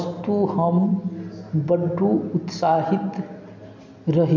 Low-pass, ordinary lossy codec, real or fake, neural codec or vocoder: 7.2 kHz; MP3, 48 kbps; real; none